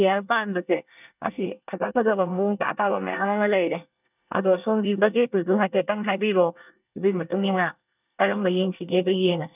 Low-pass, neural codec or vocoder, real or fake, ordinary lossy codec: 3.6 kHz; codec, 24 kHz, 1 kbps, SNAC; fake; none